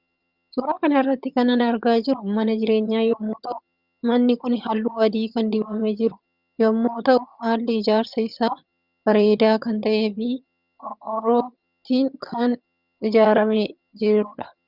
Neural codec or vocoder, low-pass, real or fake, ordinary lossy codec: vocoder, 22.05 kHz, 80 mel bands, HiFi-GAN; 5.4 kHz; fake; Opus, 64 kbps